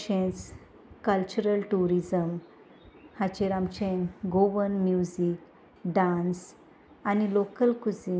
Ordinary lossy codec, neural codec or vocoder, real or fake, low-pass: none; none; real; none